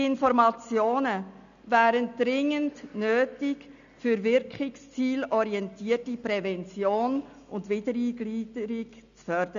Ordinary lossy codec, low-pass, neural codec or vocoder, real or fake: none; 7.2 kHz; none; real